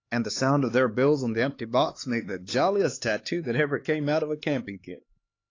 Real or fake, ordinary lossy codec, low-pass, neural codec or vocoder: fake; AAC, 32 kbps; 7.2 kHz; codec, 16 kHz, 4 kbps, X-Codec, HuBERT features, trained on LibriSpeech